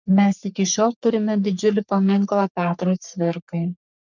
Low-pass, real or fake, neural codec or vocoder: 7.2 kHz; fake; codec, 44.1 kHz, 3.4 kbps, Pupu-Codec